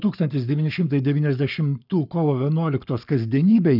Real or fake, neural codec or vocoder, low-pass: fake; codec, 44.1 kHz, 7.8 kbps, Pupu-Codec; 5.4 kHz